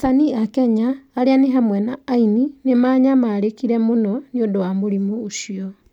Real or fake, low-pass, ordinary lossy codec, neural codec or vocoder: real; 19.8 kHz; none; none